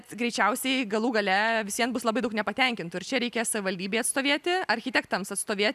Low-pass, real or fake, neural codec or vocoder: 14.4 kHz; real; none